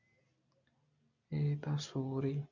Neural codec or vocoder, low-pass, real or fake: none; 7.2 kHz; real